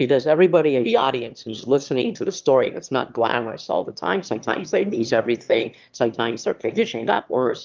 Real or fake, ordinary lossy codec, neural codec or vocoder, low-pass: fake; Opus, 24 kbps; autoencoder, 22.05 kHz, a latent of 192 numbers a frame, VITS, trained on one speaker; 7.2 kHz